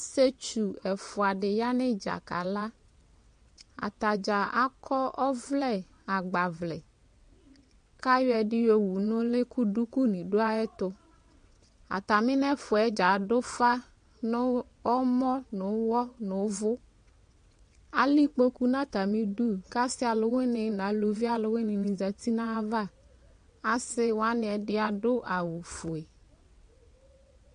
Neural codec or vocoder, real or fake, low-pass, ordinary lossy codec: vocoder, 22.05 kHz, 80 mel bands, WaveNeXt; fake; 9.9 kHz; MP3, 48 kbps